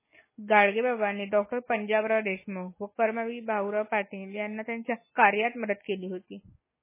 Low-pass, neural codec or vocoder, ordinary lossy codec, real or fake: 3.6 kHz; none; MP3, 16 kbps; real